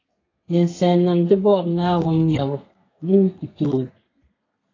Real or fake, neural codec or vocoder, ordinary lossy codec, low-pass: fake; codec, 32 kHz, 1.9 kbps, SNAC; AAC, 32 kbps; 7.2 kHz